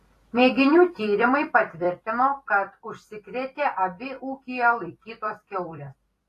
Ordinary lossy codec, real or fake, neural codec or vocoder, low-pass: AAC, 48 kbps; fake; vocoder, 44.1 kHz, 128 mel bands every 256 samples, BigVGAN v2; 14.4 kHz